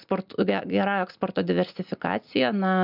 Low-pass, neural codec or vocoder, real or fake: 5.4 kHz; none; real